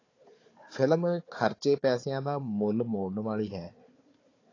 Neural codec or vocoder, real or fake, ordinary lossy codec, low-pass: codec, 16 kHz, 4 kbps, FunCodec, trained on Chinese and English, 50 frames a second; fake; AAC, 32 kbps; 7.2 kHz